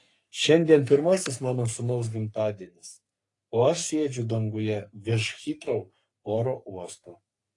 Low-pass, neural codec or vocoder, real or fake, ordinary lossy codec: 10.8 kHz; codec, 44.1 kHz, 3.4 kbps, Pupu-Codec; fake; AAC, 48 kbps